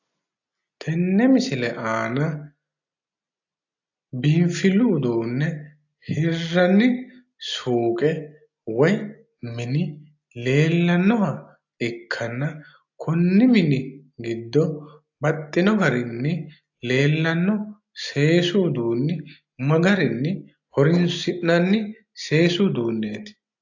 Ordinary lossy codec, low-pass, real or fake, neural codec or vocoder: AAC, 48 kbps; 7.2 kHz; real; none